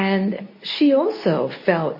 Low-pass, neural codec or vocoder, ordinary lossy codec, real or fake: 5.4 kHz; codec, 24 kHz, 0.9 kbps, WavTokenizer, medium speech release version 2; MP3, 24 kbps; fake